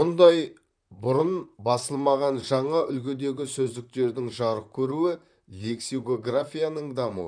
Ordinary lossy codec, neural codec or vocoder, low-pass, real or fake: none; vocoder, 44.1 kHz, 128 mel bands, Pupu-Vocoder; 9.9 kHz; fake